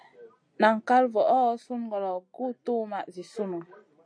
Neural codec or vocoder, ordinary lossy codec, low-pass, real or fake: none; AAC, 64 kbps; 9.9 kHz; real